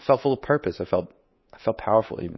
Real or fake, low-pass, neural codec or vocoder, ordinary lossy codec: fake; 7.2 kHz; codec, 24 kHz, 3.1 kbps, DualCodec; MP3, 24 kbps